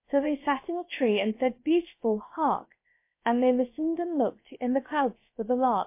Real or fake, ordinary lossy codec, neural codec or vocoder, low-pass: fake; MP3, 24 kbps; codec, 16 kHz, 0.7 kbps, FocalCodec; 3.6 kHz